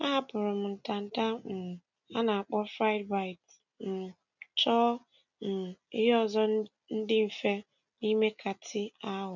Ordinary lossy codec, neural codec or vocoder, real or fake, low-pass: none; none; real; 7.2 kHz